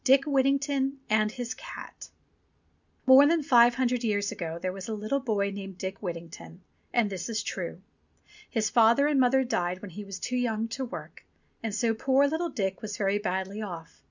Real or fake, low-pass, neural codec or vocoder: real; 7.2 kHz; none